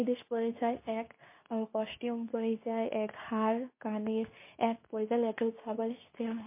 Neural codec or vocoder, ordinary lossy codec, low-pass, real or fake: codec, 24 kHz, 0.9 kbps, WavTokenizer, medium speech release version 2; MP3, 24 kbps; 3.6 kHz; fake